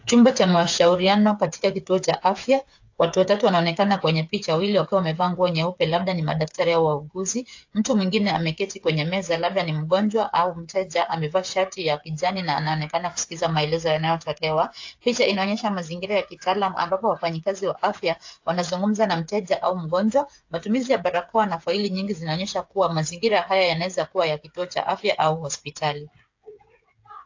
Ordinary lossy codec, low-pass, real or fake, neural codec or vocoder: AAC, 48 kbps; 7.2 kHz; fake; codec, 16 kHz, 8 kbps, FreqCodec, smaller model